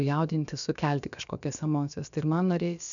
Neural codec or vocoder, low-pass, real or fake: codec, 16 kHz, about 1 kbps, DyCAST, with the encoder's durations; 7.2 kHz; fake